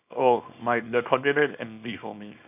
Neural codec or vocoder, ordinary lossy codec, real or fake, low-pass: codec, 24 kHz, 0.9 kbps, WavTokenizer, small release; none; fake; 3.6 kHz